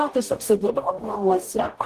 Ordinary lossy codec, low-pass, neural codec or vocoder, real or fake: Opus, 16 kbps; 14.4 kHz; codec, 44.1 kHz, 0.9 kbps, DAC; fake